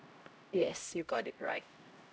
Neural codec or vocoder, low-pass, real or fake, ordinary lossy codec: codec, 16 kHz, 0.5 kbps, X-Codec, HuBERT features, trained on LibriSpeech; none; fake; none